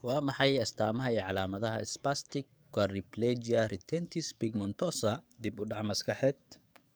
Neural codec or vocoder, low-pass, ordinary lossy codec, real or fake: codec, 44.1 kHz, 7.8 kbps, DAC; none; none; fake